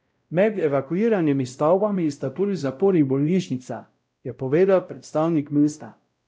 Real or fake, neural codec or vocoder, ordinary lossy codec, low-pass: fake; codec, 16 kHz, 0.5 kbps, X-Codec, WavLM features, trained on Multilingual LibriSpeech; none; none